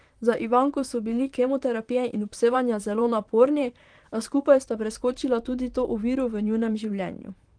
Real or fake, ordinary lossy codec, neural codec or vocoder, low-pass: fake; Opus, 24 kbps; vocoder, 44.1 kHz, 128 mel bands, Pupu-Vocoder; 9.9 kHz